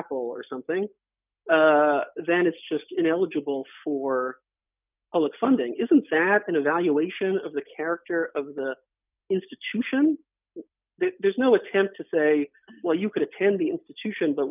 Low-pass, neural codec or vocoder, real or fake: 3.6 kHz; none; real